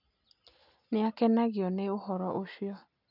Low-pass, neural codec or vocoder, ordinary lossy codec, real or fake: 5.4 kHz; none; none; real